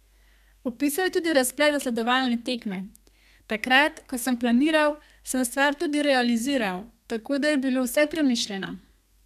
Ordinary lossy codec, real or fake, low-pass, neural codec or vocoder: none; fake; 14.4 kHz; codec, 32 kHz, 1.9 kbps, SNAC